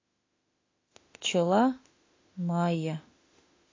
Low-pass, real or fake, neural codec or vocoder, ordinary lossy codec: 7.2 kHz; fake; autoencoder, 48 kHz, 32 numbers a frame, DAC-VAE, trained on Japanese speech; AAC, 32 kbps